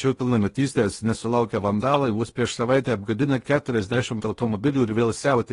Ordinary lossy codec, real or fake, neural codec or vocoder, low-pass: AAC, 32 kbps; fake; codec, 16 kHz in and 24 kHz out, 0.8 kbps, FocalCodec, streaming, 65536 codes; 10.8 kHz